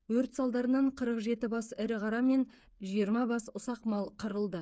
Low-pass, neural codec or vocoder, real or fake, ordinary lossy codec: none; codec, 16 kHz, 8 kbps, FreqCodec, smaller model; fake; none